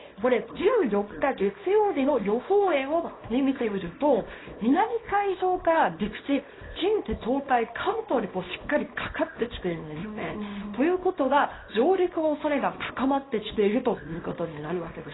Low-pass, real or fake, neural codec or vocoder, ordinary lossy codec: 7.2 kHz; fake; codec, 24 kHz, 0.9 kbps, WavTokenizer, small release; AAC, 16 kbps